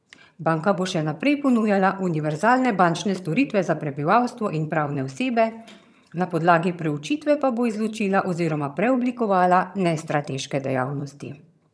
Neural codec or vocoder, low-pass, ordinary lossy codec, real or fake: vocoder, 22.05 kHz, 80 mel bands, HiFi-GAN; none; none; fake